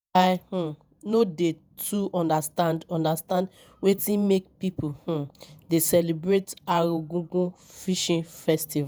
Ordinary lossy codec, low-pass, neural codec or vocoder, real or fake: none; none; vocoder, 48 kHz, 128 mel bands, Vocos; fake